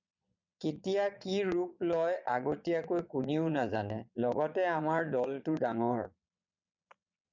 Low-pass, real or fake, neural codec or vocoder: 7.2 kHz; fake; vocoder, 22.05 kHz, 80 mel bands, Vocos